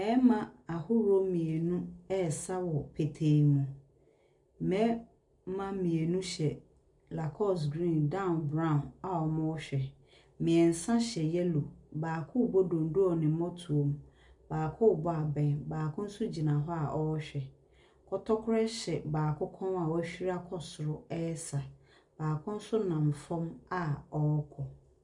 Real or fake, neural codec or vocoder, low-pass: real; none; 10.8 kHz